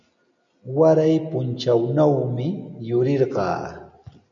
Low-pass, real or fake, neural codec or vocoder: 7.2 kHz; real; none